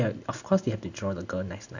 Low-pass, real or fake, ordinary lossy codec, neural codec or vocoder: 7.2 kHz; real; none; none